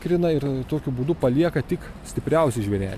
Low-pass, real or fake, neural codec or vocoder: 14.4 kHz; real; none